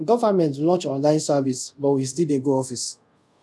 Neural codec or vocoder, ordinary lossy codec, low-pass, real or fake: codec, 24 kHz, 0.5 kbps, DualCodec; none; none; fake